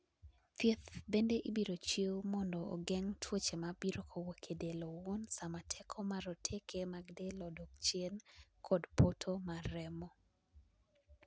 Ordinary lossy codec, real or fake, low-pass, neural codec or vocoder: none; real; none; none